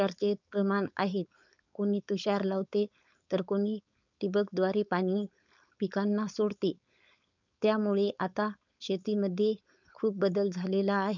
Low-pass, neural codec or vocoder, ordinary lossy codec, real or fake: 7.2 kHz; codec, 16 kHz, 4.8 kbps, FACodec; none; fake